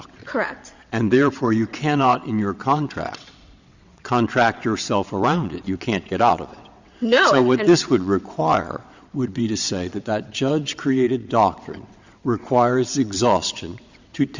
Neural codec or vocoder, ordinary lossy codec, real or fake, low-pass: vocoder, 22.05 kHz, 80 mel bands, WaveNeXt; Opus, 64 kbps; fake; 7.2 kHz